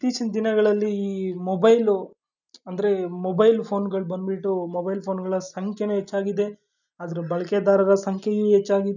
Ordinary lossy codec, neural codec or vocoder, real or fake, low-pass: none; none; real; 7.2 kHz